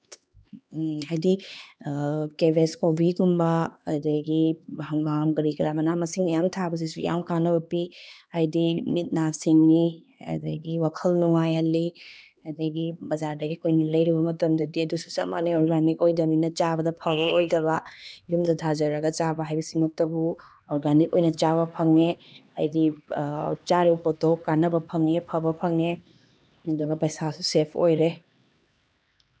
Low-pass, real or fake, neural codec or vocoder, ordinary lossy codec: none; fake; codec, 16 kHz, 2 kbps, X-Codec, HuBERT features, trained on LibriSpeech; none